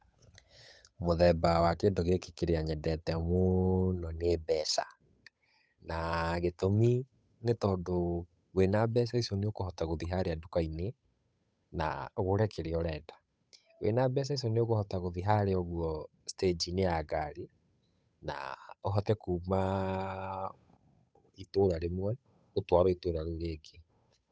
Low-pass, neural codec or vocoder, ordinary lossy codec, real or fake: none; codec, 16 kHz, 8 kbps, FunCodec, trained on Chinese and English, 25 frames a second; none; fake